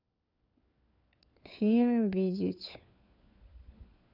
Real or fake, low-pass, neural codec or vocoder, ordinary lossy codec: fake; 5.4 kHz; codec, 16 kHz, 4 kbps, FunCodec, trained on LibriTTS, 50 frames a second; none